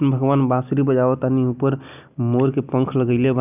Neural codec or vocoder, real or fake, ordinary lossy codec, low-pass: none; real; none; 3.6 kHz